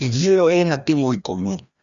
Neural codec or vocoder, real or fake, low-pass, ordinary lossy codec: codec, 16 kHz, 1 kbps, FreqCodec, larger model; fake; 7.2 kHz; Opus, 64 kbps